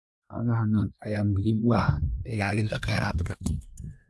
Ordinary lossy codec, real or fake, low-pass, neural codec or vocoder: none; fake; none; codec, 24 kHz, 1 kbps, SNAC